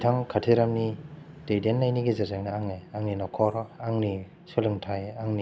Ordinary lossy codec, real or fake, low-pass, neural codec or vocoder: none; real; none; none